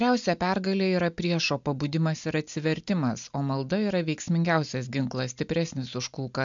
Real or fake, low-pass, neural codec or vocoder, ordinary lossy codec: real; 7.2 kHz; none; MP3, 64 kbps